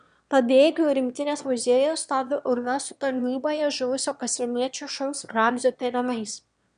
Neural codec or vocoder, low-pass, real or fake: autoencoder, 22.05 kHz, a latent of 192 numbers a frame, VITS, trained on one speaker; 9.9 kHz; fake